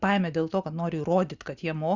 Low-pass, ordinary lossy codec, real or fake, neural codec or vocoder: 7.2 kHz; Opus, 64 kbps; real; none